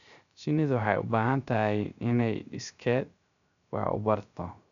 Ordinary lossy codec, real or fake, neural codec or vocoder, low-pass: none; fake; codec, 16 kHz, 0.3 kbps, FocalCodec; 7.2 kHz